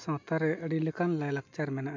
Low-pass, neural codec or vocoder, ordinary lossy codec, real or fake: 7.2 kHz; none; MP3, 48 kbps; real